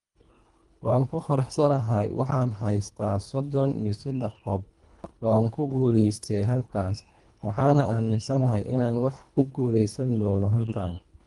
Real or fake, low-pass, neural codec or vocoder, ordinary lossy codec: fake; 10.8 kHz; codec, 24 kHz, 1.5 kbps, HILCodec; Opus, 32 kbps